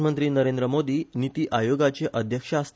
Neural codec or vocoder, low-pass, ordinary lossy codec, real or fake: none; none; none; real